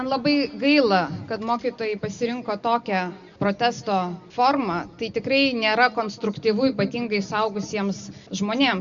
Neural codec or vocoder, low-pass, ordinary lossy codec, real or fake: none; 7.2 kHz; Opus, 64 kbps; real